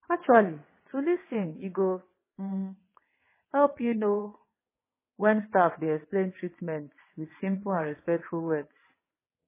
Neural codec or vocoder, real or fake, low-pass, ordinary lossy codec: vocoder, 22.05 kHz, 80 mel bands, WaveNeXt; fake; 3.6 kHz; MP3, 16 kbps